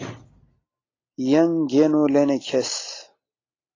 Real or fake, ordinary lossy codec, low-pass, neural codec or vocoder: real; AAC, 32 kbps; 7.2 kHz; none